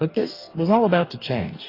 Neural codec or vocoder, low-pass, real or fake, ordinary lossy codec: codec, 44.1 kHz, 2.6 kbps, DAC; 5.4 kHz; fake; AAC, 24 kbps